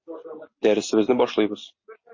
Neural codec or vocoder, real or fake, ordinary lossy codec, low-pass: none; real; MP3, 32 kbps; 7.2 kHz